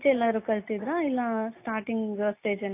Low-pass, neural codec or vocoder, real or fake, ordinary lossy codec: 3.6 kHz; none; real; AAC, 24 kbps